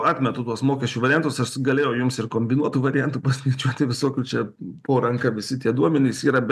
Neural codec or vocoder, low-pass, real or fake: none; 14.4 kHz; real